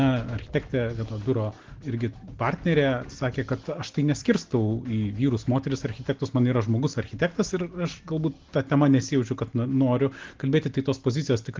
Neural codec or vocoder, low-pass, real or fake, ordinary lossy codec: none; 7.2 kHz; real; Opus, 16 kbps